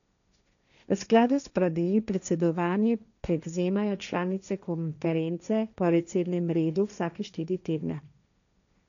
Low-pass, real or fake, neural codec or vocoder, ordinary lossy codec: 7.2 kHz; fake; codec, 16 kHz, 1.1 kbps, Voila-Tokenizer; none